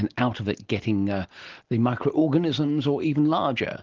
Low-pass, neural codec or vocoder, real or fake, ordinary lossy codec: 7.2 kHz; none; real; Opus, 16 kbps